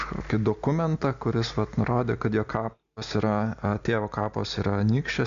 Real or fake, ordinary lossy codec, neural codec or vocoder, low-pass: real; Opus, 64 kbps; none; 7.2 kHz